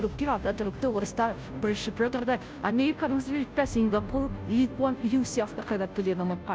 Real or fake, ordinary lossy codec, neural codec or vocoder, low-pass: fake; none; codec, 16 kHz, 0.5 kbps, FunCodec, trained on Chinese and English, 25 frames a second; none